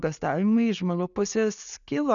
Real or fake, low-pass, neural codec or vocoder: real; 7.2 kHz; none